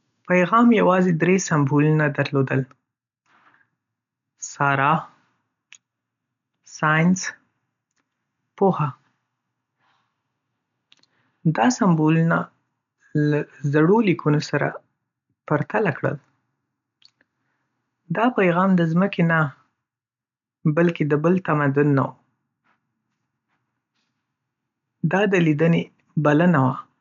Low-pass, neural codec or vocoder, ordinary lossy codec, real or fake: 7.2 kHz; none; none; real